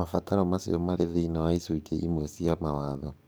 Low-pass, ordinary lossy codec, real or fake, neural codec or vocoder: none; none; fake; codec, 44.1 kHz, 7.8 kbps, DAC